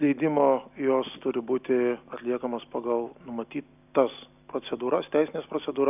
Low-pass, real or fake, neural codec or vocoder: 3.6 kHz; real; none